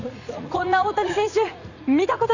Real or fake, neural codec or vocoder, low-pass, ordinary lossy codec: fake; vocoder, 44.1 kHz, 80 mel bands, Vocos; 7.2 kHz; none